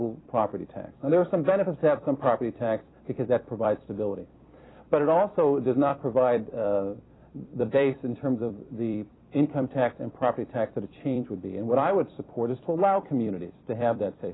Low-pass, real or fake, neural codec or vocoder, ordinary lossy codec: 7.2 kHz; fake; vocoder, 44.1 kHz, 128 mel bands every 256 samples, BigVGAN v2; AAC, 16 kbps